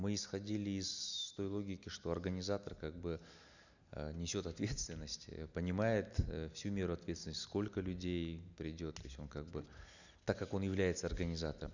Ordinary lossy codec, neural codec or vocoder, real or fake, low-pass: none; none; real; 7.2 kHz